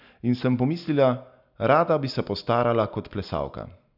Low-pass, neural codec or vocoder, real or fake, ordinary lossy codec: 5.4 kHz; none; real; none